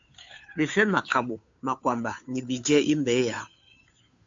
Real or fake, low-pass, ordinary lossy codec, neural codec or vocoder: fake; 7.2 kHz; AAC, 64 kbps; codec, 16 kHz, 2 kbps, FunCodec, trained on Chinese and English, 25 frames a second